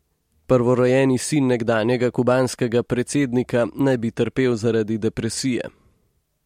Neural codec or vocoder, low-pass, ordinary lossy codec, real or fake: none; 19.8 kHz; MP3, 64 kbps; real